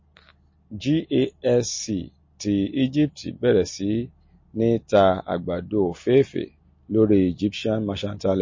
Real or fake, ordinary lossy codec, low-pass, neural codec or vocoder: real; MP3, 32 kbps; 7.2 kHz; none